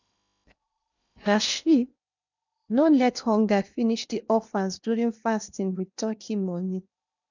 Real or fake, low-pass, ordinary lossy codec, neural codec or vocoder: fake; 7.2 kHz; none; codec, 16 kHz in and 24 kHz out, 0.8 kbps, FocalCodec, streaming, 65536 codes